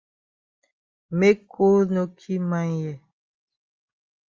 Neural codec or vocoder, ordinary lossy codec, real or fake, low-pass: none; Opus, 32 kbps; real; 7.2 kHz